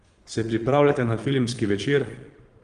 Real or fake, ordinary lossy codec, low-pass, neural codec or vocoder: fake; Opus, 24 kbps; 9.9 kHz; vocoder, 22.05 kHz, 80 mel bands, Vocos